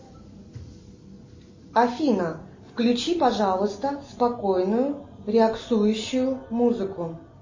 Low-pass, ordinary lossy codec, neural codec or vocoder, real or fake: 7.2 kHz; MP3, 32 kbps; none; real